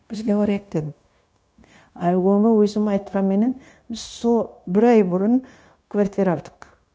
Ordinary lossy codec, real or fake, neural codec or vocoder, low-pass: none; fake; codec, 16 kHz, 0.9 kbps, LongCat-Audio-Codec; none